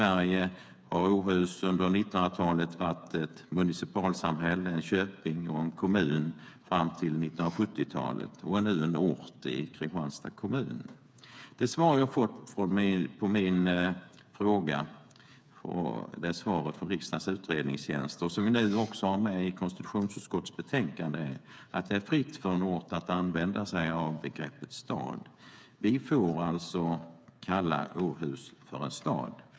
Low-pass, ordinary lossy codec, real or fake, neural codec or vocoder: none; none; fake; codec, 16 kHz, 8 kbps, FreqCodec, smaller model